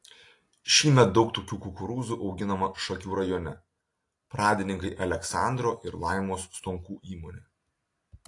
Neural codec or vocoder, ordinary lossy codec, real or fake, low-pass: none; AAC, 48 kbps; real; 10.8 kHz